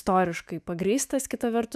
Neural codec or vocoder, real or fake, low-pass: autoencoder, 48 kHz, 128 numbers a frame, DAC-VAE, trained on Japanese speech; fake; 14.4 kHz